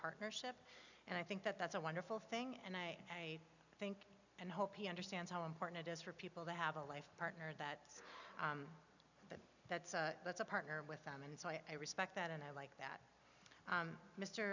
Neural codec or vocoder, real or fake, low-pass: none; real; 7.2 kHz